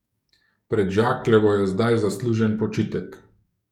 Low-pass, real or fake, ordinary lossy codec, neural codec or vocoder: 19.8 kHz; fake; none; codec, 44.1 kHz, 7.8 kbps, DAC